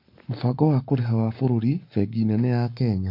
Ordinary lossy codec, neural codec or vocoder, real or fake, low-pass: MP3, 32 kbps; codec, 44.1 kHz, 7.8 kbps, Pupu-Codec; fake; 5.4 kHz